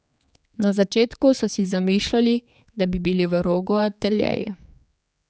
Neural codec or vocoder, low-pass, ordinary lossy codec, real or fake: codec, 16 kHz, 4 kbps, X-Codec, HuBERT features, trained on general audio; none; none; fake